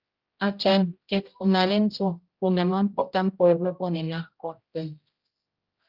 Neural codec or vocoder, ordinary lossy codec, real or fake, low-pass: codec, 16 kHz, 0.5 kbps, X-Codec, HuBERT features, trained on general audio; Opus, 24 kbps; fake; 5.4 kHz